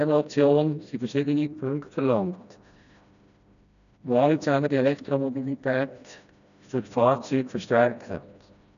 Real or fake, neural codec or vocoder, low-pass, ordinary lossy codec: fake; codec, 16 kHz, 1 kbps, FreqCodec, smaller model; 7.2 kHz; none